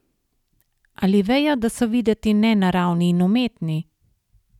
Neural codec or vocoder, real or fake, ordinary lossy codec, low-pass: none; real; none; 19.8 kHz